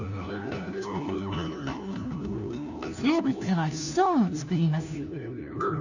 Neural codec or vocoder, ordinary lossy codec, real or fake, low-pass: codec, 16 kHz, 1 kbps, FunCodec, trained on LibriTTS, 50 frames a second; none; fake; 7.2 kHz